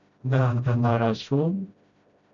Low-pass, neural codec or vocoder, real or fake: 7.2 kHz; codec, 16 kHz, 0.5 kbps, FreqCodec, smaller model; fake